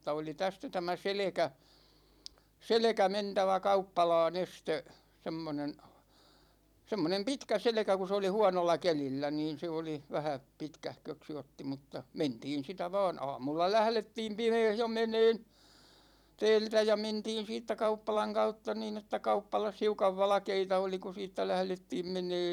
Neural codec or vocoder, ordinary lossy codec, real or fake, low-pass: vocoder, 44.1 kHz, 128 mel bands every 256 samples, BigVGAN v2; none; fake; 19.8 kHz